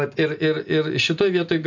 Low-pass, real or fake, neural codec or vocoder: 7.2 kHz; real; none